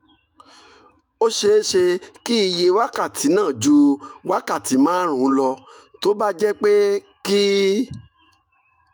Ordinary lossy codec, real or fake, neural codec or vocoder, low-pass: none; fake; autoencoder, 48 kHz, 128 numbers a frame, DAC-VAE, trained on Japanese speech; none